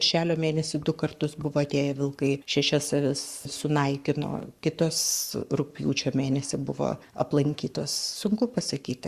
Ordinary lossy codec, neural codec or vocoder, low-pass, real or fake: Opus, 64 kbps; codec, 44.1 kHz, 7.8 kbps, Pupu-Codec; 14.4 kHz; fake